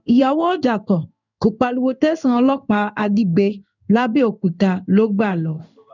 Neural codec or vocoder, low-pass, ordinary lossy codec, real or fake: codec, 16 kHz in and 24 kHz out, 1 kbps, XY-Tokenizer; 7.2 kHz; none; fake